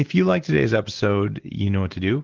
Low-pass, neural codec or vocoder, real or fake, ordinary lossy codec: 7.2 kHz; none; real; Opus, 16 kbps